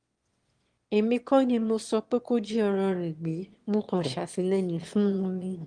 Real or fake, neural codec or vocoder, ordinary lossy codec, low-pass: fake; autoencoder, 22.05 kHz, a latent of 192 numbers a frame, VITS, trained on one speaker; Opus, 24 kbps; 9.9 kHz